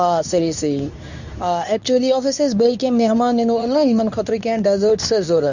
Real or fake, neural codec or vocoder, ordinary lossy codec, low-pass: fake; codec, 24 kHz, 0.9 kbps, WavTokenizer, medium speech release version 2; none; 7.2 kHz